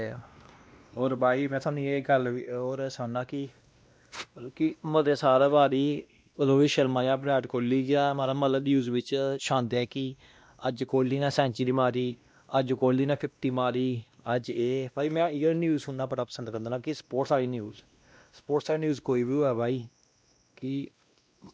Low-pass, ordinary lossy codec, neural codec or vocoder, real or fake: none; none; codec, 16 kHz, 1 kbps, X-Codec, WavLM features, trained on Multilingual LibriSpeech; fake